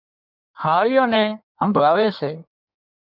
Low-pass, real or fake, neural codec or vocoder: 5.4 kHz; fake; codec, 16 kHz in and 24 kHz out, 1.1 kbps, FireRedTTS-2 codec